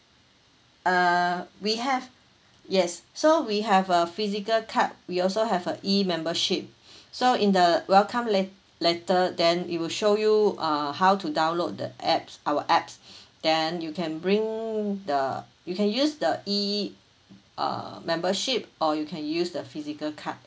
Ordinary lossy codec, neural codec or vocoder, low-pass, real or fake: none; none; none; real